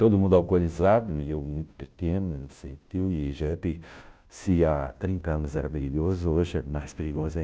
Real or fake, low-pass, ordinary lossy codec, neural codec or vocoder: fake; none; none; codec, 16 kHz, 0.5 kbps, FunCodec, trained on Chinese and English, 25 frames a second